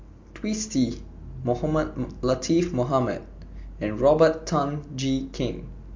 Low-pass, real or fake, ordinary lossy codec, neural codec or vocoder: 7.2 kHz; real; MP3, 48 kbps; none